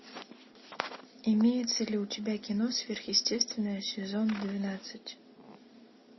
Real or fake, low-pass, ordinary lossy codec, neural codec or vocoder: real; 7.2 kHz; MP3, 24 kbps; none